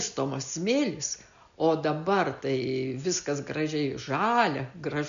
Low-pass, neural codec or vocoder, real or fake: 7.2 kHz; none; real